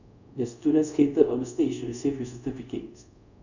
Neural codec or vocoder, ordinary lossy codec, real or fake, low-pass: codec, 24 kHz, 0.5 kbps, DualCodec; none; fake; 7.2 kHz